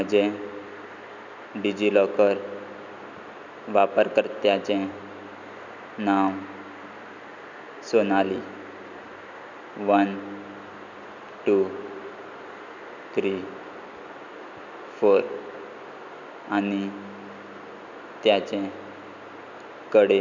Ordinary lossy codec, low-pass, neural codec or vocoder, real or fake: none; 7.2 kHz; none; real